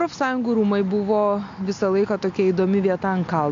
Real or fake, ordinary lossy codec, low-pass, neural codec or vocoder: real; AAC, 64 kbps; 7.2 kHz; none